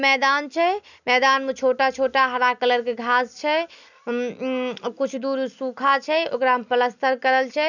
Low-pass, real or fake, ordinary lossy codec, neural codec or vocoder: 7.2 kHz; real; none; none